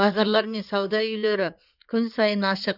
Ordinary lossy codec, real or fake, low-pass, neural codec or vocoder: none; fake; 5.4 kHz; codec, 16 kHz in and 24 kHz out, 2.2 kbps, FireRedTTS-2 codec